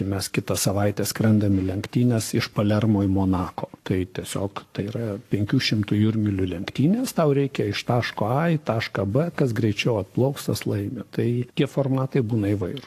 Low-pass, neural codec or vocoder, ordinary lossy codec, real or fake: 14.4 kHz; codec, 44.1 kHz, 7.8 kbps, Pupu-Codec; AAC, 64 kbps; fake